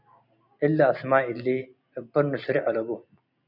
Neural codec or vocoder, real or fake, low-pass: none; real; 5.4 kHz